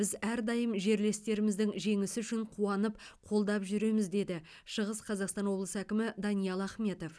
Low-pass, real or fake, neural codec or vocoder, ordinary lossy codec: none; real; none; none